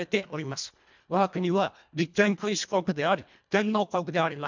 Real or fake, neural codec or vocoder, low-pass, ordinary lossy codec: fake; codec, 24 kHz, 1.5 kbps, HILCodec; 7.2 kHz; MP3, 64 kbps